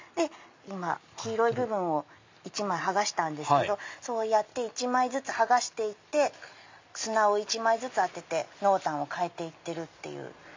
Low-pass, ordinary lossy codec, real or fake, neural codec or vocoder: 7.2 kHz; MP3, 48 kbps; real; none